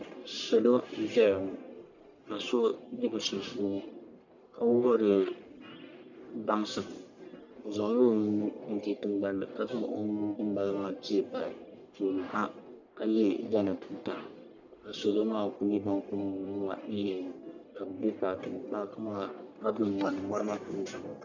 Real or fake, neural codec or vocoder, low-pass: fake; codec, 44.1 kHz, 1.7 kbps, Pupu-Codec; 7.2 kHz